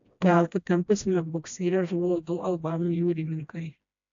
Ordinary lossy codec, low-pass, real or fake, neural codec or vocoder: AAC, 64 kbps; 7.2 kHz; fake; codec, 16 kHz, 1 kbps, FreqCodec, smaller model